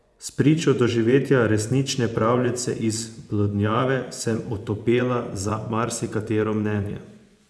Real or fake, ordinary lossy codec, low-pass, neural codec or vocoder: fake; none; none; vocoder, 24 kHz, 100 mel bands, Vocos